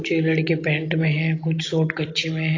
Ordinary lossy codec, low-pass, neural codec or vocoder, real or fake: AAC, 32 kbps; 7.2 kHz; none; real